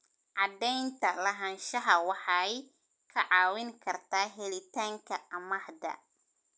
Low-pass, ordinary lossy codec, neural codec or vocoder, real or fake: none; none; none; real